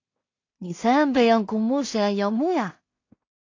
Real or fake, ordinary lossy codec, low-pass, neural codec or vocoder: fake; AAC, 48 kbps; 7.2 kHz; codec, 16 kHz in and 24 kHz out, 0.4 kbps, LongCat-Audio-Codec, two codebook decoder